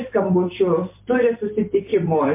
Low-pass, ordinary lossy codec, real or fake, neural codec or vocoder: 3.6 kHz; MP3, 24 kbps; fake; vocoder, 44.1 kHz, 128 mel bands every 256 samples, BigVGAN v2